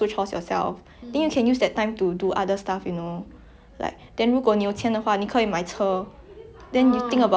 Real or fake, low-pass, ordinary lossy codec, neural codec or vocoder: real; none; none; none